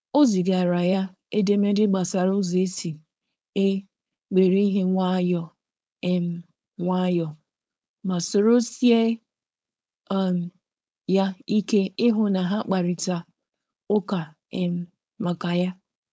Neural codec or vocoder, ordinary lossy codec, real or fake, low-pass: codec, 16 kHz, 4.8 kbps, FACodec; none; fake; none